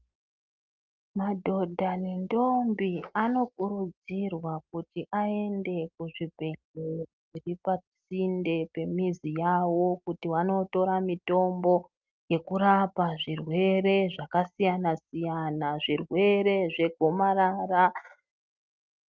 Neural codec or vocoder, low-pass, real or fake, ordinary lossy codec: none; 7.2 kHz; real; Opus, 24 kbps